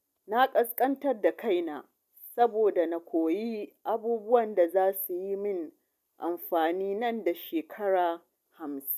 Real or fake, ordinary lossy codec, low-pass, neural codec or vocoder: real; none; 14.4 kHz; none